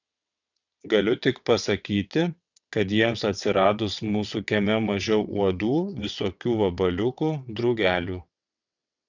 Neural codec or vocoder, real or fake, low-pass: vocoder, 22.05 kHz, 80 mel bands, WaveNeXt; fake; 7.2 kHz